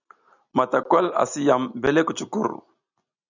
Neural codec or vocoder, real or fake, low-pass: none; real; 7.2 kHz